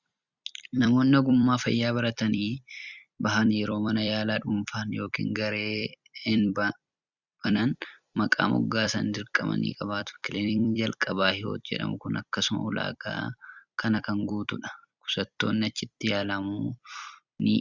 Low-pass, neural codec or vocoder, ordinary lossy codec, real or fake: 7.2 kHz; vocoder, 44.1 kHz, 128 mel bands every 256 samples, BigVGAN v2; Opus, 64 kbps; fake